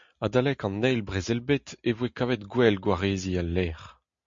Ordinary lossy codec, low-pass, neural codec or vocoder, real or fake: MP3, 48 kbps; 7.2 kHz; none; real